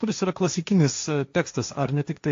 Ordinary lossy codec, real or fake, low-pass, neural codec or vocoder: AAC, 48 kbps; fake; 7.2 kHz; codec, 16 kHz, 1.1 kbps, Voila-Tokenizer